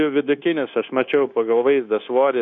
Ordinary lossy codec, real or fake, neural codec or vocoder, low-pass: AAC, 64 kbps; fake; codec, 16 kHz, 0.9 kbps, LongCat-Audio-Codec; 7.2 kHz